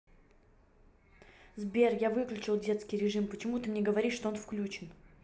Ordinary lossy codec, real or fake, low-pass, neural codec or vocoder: none; real; none; none